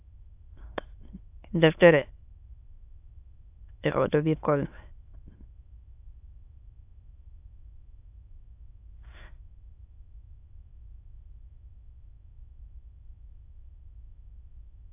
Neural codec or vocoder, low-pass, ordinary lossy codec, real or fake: autoencoder, 22.05 kHz, a latent of 192 numbers a frame, VITS, trained on many speakers; 3.6 kHz; AAC, 32 kbps; fake